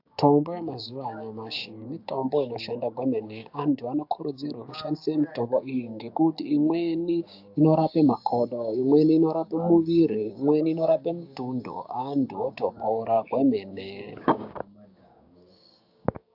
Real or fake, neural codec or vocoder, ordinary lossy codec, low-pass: fake; codec, 16 kHz, 6 kbps, DAC; AAC, 48 kbps; 5.4 kHz